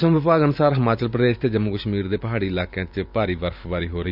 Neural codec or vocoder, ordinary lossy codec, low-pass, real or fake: vocoder, 44.1 kHz, 128 mel bands every 512 samples, BigVGAN v2; none; 5.4 kHz; fake